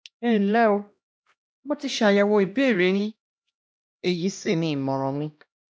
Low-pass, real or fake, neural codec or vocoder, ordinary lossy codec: none; fake; codec, 16 kHz, 1 kbps, X-Codec, HuBERT features, trained on LibriSpeech; none